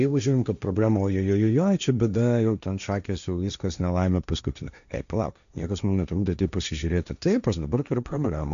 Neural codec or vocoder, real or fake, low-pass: codec, 16 kHz, 1.1 kbps, Voila-Tokenizer; fake; 7.2 kHz